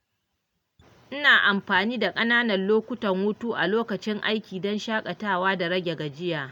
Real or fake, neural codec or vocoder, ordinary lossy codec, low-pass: real; none; none; 19.8 kHz